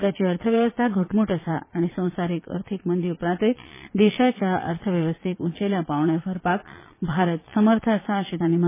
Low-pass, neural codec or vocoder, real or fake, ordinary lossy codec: 3.6 kHz; vocoder, 22.05 kHz, 80 mel bands, Vocos; fake; MP3, 16 kbps